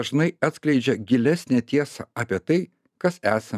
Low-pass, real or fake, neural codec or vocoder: 14.4 kHz; fake; vocoder, 44.1 kHz, 128 mel bands every 512 samples, BigVGAN v2